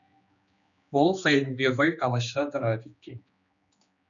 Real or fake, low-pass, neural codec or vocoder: fake; 7.2 kHz; codec, 16 kHz, 2 kbps, X-Codec, HuBERT features, trained on general audio